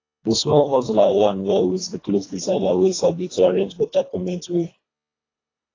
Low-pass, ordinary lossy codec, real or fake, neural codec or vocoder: 7.2 kHz; AAC, 32 kbps; fake; codec, 24 kHz, 1.5 kbps, HILCodec